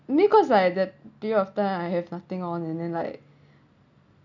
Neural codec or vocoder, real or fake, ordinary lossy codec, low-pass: none; real; none; 7.2 kHz